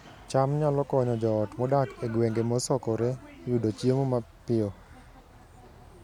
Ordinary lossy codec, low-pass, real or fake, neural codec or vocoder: none; 19.8 kHz; real; none